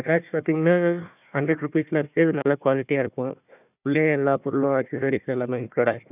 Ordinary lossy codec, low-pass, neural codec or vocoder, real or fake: none; 3.6 kHz; codec, 16 kHz, 1 kbps, FunCodec, trained on Chinese and English, 50 frames a second; fake